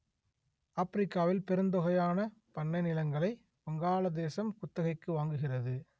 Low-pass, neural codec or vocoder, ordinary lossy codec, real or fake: none; none; none; real